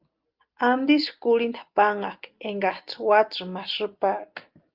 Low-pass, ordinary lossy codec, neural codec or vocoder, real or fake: 5.4 kHz; Opus, 32 kbps; none; real